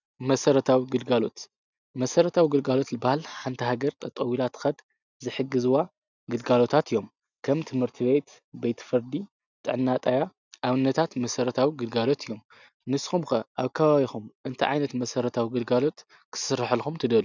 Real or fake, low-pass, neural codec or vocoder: real; 7.2 kHz; none